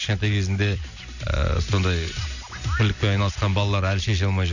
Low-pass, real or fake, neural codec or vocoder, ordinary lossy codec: 7.2 kHz; real; none; none